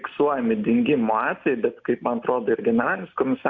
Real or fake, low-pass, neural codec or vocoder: real; 7.2 kHz; none